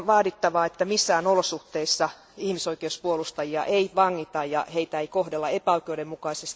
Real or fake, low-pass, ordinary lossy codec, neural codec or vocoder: real; none; none; none